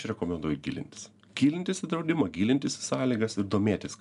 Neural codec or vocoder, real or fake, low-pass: none; real; 10.8 kHz